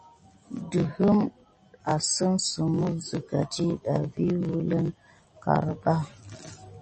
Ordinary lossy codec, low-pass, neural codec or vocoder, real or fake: MP3, 32 kbps; 10.8 kHz; none; real